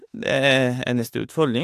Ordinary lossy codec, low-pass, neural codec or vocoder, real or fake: AAC, 64 kbps; 14.4 kHz; autoencoder, 48 kHz, 32 numbers a frame, DAC-VAE, trained on Japanese speech; fake